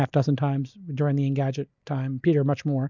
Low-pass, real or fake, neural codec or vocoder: 7.2 kHz; real; none